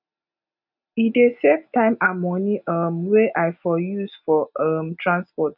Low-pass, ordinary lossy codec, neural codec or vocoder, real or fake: 5.4 kHz; none; none; real